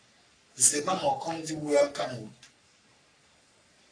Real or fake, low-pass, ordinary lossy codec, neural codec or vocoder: fake; 9.9 kHz; AAC, 48 kbps; codec, 44.1 kHz, 3.4 kbps, Pupu-Codec